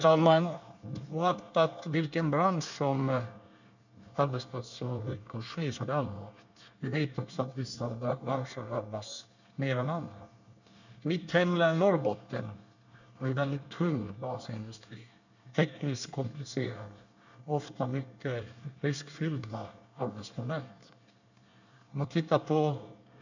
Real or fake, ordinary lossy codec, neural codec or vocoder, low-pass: fake; none; codec, 24 kHz, 1 kbps, SNAC; 7.2 kHz